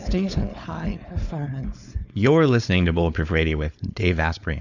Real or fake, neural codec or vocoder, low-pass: fake; codec, 16 kHz, 4.8 kbps, FACodec; 7.2 kHz